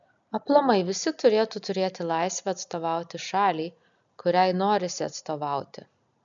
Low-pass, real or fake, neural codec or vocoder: 7.2 kHz; real; none